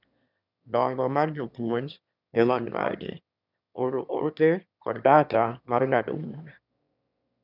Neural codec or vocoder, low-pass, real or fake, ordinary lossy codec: autoencoder, 22.05 kHz, a latent of 192 numbers a frame, VITS, trained on one speaker; 5.4 kHz; fake; none